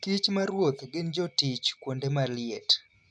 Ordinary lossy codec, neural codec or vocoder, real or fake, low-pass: none; none; real; none